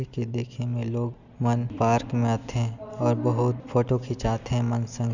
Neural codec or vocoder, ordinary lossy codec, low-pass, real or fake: none; none; 7.2 kHz; real